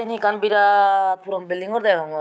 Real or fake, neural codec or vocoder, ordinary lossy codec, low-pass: fake; codec, 16 kHz, 6 kbps, DAC; none; none